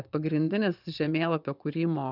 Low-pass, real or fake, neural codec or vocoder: 5.4 kHz; fake; vocoder, 22.05 kHz, 80 mel bands, Vocos